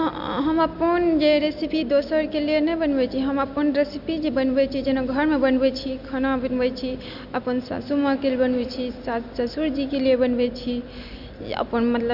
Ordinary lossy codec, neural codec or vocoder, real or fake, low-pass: Opus, 64 kbps; none; real; 5.4 kHz